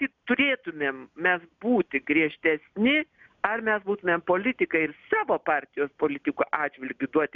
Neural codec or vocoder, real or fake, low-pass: none; real; 7.2 kHz